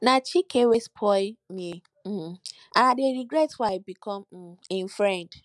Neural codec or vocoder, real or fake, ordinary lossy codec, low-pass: none; real; none; none